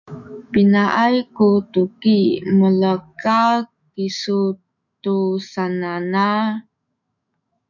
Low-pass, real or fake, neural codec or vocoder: 7.2 kHz; fake; autoencoder, 48 kHz, 128 numbers a frame, DAC-VAE, trained on Japanese speech